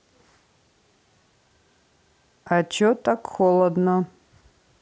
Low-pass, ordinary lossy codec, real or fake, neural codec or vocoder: none; none; real; none